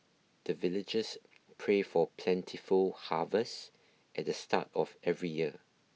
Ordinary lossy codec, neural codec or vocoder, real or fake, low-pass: none; none; real; none